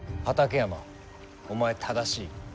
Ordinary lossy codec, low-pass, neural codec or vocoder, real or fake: none; none; none; real